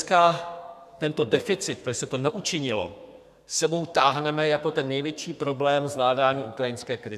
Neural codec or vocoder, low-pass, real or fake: codec, 32 kHz, 1.9 kbps, SNAC; 14.4 kHz; fake